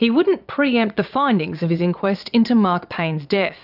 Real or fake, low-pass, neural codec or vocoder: fake; 5.4 kHz; vocoder, 22.05 kHz, 80 mel bands, Vocos